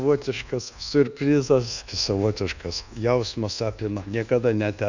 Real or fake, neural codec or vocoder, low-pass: fake; codec, 24 kHz, 1.2 kbps, DualCodec; 7.2 kHz